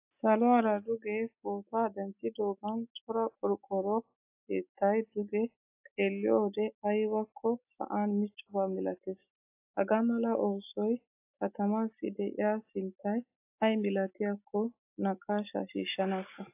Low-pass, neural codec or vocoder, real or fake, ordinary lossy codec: 3.6 kHz; none; real; AAC, 32 kbps